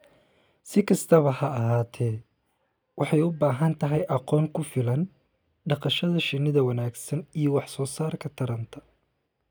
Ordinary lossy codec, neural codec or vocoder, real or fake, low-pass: none; none; real; none